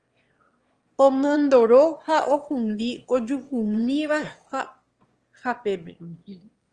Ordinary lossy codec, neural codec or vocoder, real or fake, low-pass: Opus, 24 kbps; autoencoder, 22.05 kHz, a latent of 192 numbers a frame, VITS, trained on one speaker; fake; 9.9 kHz